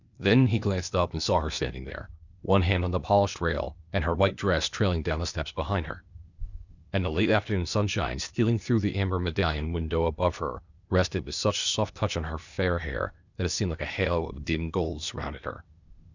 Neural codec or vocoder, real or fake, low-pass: codec, 16 kHz, 0.8 kbps, ZipCodec; fake; 7.2 kHz